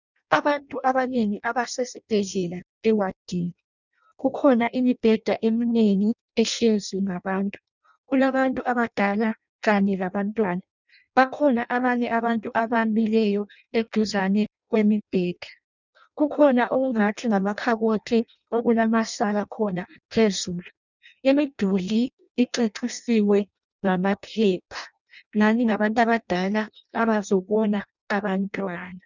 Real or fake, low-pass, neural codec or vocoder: fake; 7.2 kHz; codec, 16 kHz in and 24 kHz out, 0.6 kbps, FireRedTTS-2 codec